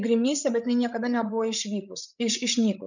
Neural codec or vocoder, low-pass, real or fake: codec, 16 kHz, 8 kbps, FreqCodec, larger model; 7.2 kHz; fake